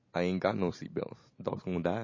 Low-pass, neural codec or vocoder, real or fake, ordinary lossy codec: 7.2 kHz; none; real; MP3, 32 kbps